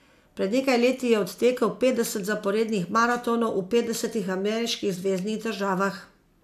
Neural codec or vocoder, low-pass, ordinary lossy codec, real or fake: none; 14.4 kHz; AAC, 96 kbps; real